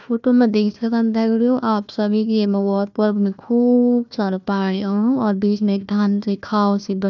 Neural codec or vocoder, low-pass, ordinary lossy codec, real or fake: codec, 16 kHz, 1 kbps, FunCodec, trained on Chinese and English, 50 frames a second; 7.2 kHz; none; fake